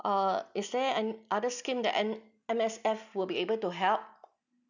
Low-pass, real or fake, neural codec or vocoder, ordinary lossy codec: 7.2 kHz; real; none; none